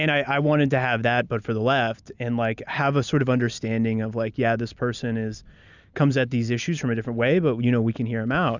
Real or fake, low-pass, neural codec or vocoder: real; 7.2 kHz; none